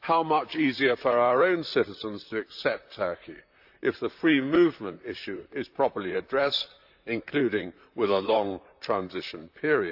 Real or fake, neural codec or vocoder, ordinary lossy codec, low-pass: fake; vocoder, 44.1 kHz, 128 mel bands, Pupu-Vocoder; AAC, 48 kbps; 5.4 kHz